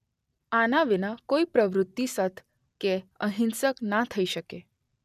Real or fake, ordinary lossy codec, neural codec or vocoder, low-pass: real; none; none; 14.4 kHz